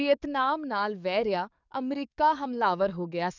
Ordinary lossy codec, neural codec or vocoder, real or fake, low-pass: none; codec, 44.1 kHz, 7.8 kbps, DAC; fake; 7.2 kHz